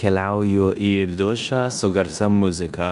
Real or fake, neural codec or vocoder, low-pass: fake; codec, 16 kHz in and 24 kHz out, 0.9 kbps, LongCat-Audio-Codec, four codebook decoder; 10.8 kHz